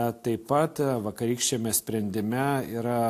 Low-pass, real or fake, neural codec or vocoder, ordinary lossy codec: 14.4 kHz; real; none; AAC, 64 kbps